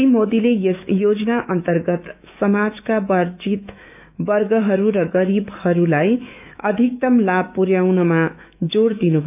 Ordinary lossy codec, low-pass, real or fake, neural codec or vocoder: none; 3.6 kHz; fake; autoencoder, 48 kHz, 128 numbers a frame, DAC-VAE, trained on Japanese speech